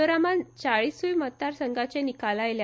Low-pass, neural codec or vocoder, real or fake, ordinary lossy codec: none; none; real; none